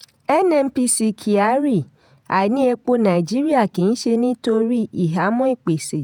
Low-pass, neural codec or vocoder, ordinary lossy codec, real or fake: 19.8 kHz; vocoder, 48 kHz, 128 mel bands, Vocos; none; fake